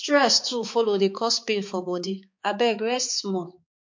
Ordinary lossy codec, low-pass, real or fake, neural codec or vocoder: MP3, 48 kbps; 7.2 kHz; fake; codec, 16 kHz, 4 kbps, X-Codec, HuBERT features, trained on balanced general audio